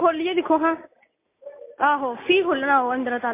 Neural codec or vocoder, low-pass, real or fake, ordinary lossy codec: none; 3.6 kHz; real; MP3, 24 kbps